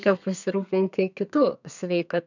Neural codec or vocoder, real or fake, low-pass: codec, 32 kHz, 1.9 kbps, SNAC; fake; 7.2 kHz